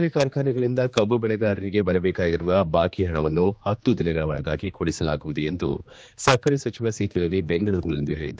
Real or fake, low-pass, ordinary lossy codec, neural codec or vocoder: fake; none; none; codec, 16 kHz, 2 kbps, X-Codec, HuBERT features, trained on general audio